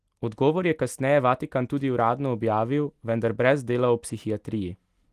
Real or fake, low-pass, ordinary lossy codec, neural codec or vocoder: real; 14.4 kHz; Opus, 24 kbps; none